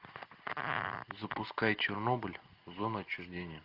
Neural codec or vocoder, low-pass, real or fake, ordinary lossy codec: none; 5.4 kHz; real; Opus, 32 kbps